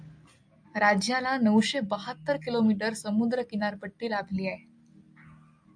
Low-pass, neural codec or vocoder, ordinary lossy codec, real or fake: 9.9 kHz; none; AAC, 64 kbps; real